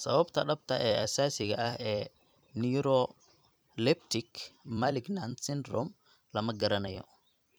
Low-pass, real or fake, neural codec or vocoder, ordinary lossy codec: none; fake; vocoder, 44.1 kHz, 128 mel bands every 256 samples, BigVGAN v2; none